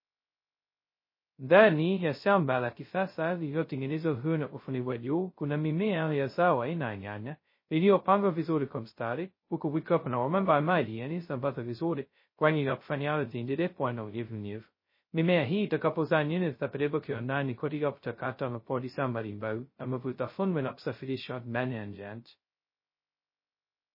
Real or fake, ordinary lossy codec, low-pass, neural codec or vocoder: fake; MP3, 24 kbps; 5.4 kHz; codec, 16 kHz, 0.2 kbps, FocalCodec